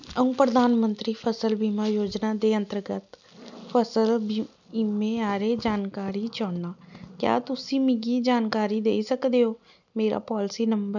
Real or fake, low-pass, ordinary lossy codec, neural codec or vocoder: real; 7.2 kHz; none; none